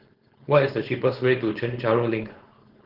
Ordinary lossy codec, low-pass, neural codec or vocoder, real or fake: Opus, 16 kbps; 5.4 kHz; codec, 16 kHz, 4.8 kbps, FACodec; fake